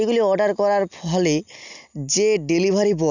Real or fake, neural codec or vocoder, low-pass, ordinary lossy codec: real; none; 7.2 kHz; none